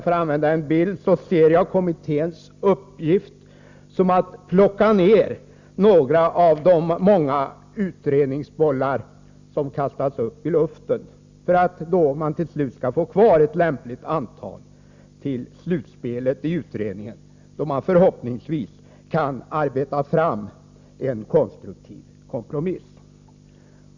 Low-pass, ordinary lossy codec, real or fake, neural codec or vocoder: 7.2 kHz; Opus, 64 kbps; real; none